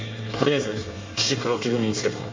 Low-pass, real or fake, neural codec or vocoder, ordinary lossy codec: 7.2 kHz; fake; codec, 24 kHz, 1 kbps, SNAC; MP3, 48 kbps